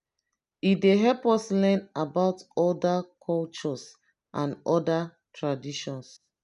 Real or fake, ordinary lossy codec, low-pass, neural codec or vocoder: real; none; 10.8 kHz; none